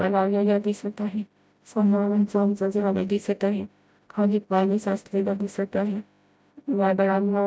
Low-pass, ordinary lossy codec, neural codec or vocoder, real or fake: none; none; codec, 16 kHz, 0.5 kbps, FreqCodec, smaller model; fake